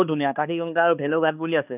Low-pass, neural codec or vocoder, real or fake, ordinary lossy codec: 3.6 kHz; codec, 16 kHz, 2 kbps, X-Codec, HuBERT features, trained on balanced general audio; fake; none